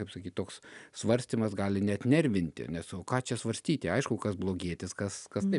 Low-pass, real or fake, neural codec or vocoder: 10.8 kHz; real; none